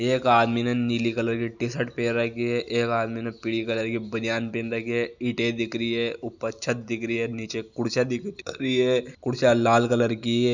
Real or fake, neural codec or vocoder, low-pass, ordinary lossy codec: real; none; 7.2 kHz; none